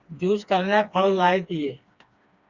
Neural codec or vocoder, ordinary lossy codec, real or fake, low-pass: codec, 16 kHz, 2 kbps, FreqCodec, smaller model; Opus, 64 kbps; fake; 7.2 kHz